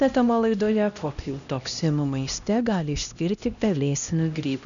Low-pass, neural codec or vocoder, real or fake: 7.2 kHz; codec, 16 kHz, 1 kbps, X-Codec, HuBERT features, trained on LibriSpeech; fake